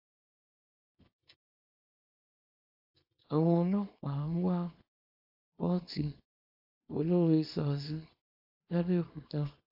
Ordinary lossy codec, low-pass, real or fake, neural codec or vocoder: AAC, 32 kbps; 5.4 kHz; fake; codec, 24 kHz, 0.9 kbps, WavTokenizer, small release